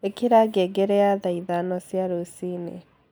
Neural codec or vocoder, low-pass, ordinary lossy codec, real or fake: none; none; none; real